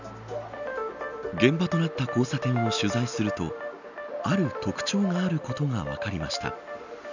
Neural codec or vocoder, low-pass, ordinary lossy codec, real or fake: none; 7.2 kHz; none; real